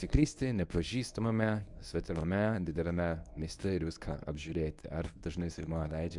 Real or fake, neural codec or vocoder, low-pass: fake; codec, 24 kHz, 0.9 kbps, WavTokenizer, medium speech release version 1; 10.8 kHz